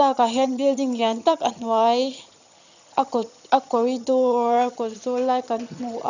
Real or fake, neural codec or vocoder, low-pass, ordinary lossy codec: fake; vocoder, 22.05 kHz, 80 mel bands, HiFi-GAN; 7.2 kHz; none